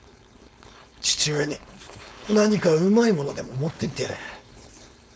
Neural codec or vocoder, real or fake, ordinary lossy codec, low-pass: codec, 16 kHz, 4.8 kbps, FACodec; fake; none; none